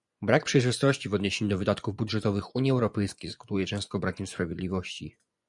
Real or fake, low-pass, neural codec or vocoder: fake; 10.8 kHz; vocoder, 24 kHz, 100 mel bands, Vocos